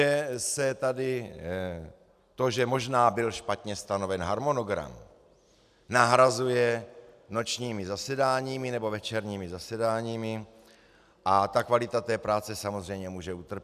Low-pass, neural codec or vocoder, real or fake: 14.4 kHz; none; real